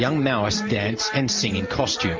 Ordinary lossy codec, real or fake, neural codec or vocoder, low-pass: Opus, 32 kbps; real; none; 7.2 kHz